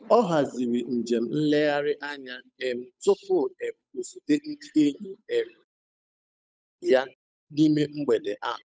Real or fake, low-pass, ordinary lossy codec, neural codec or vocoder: fake; none; none; codec, 16 kHz, 8 kbps, FunCodec, trained on Chinese and English, 25 frames a second